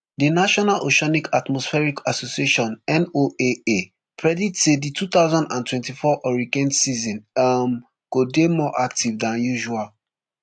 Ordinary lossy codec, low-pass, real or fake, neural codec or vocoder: AAC, 64 kbps; 9.9 kHz; real; none